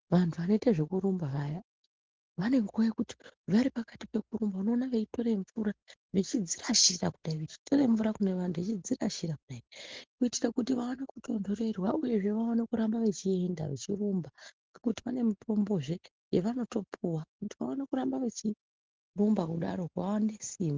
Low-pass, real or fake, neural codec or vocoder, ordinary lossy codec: 7.2 kHz; real; none; Opus, 16 kbps